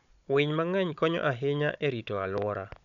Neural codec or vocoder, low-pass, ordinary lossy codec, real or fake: codec, 16 kHz, 16 kbps, FunCodec, trained on Chinese and English, 50 frames a second; 7.2 kHz; none; fake